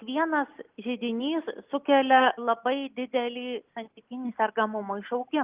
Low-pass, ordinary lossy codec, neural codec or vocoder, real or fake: 3.6 kHz; Opus, 24 kbps; none; real